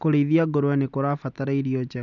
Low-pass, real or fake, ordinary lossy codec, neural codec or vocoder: 7.2 kHz; real; none; none